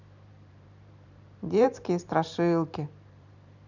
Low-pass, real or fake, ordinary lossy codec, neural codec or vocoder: 7.2 kHz; fake; none; vocoder, 44.1 kHz, 128 mel bands every 512 samples, BigVGAN v2